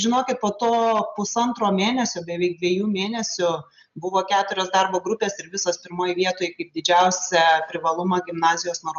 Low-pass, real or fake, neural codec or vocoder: 7.2 kHz; real; none